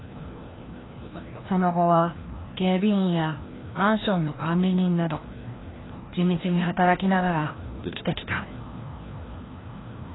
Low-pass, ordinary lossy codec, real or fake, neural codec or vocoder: 7.2 kHz; AAC, 16 kbps; fake; codec, 16 kHz, 1 kbps, FreqCodec, larger model